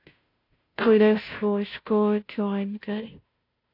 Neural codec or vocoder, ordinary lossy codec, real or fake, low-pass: codec, 16 kHz, 0.5 kbps, FunCodec, trained on Chinese and English, 25 frames a second; AAC, 32 kbps; fake; 5.4 kHz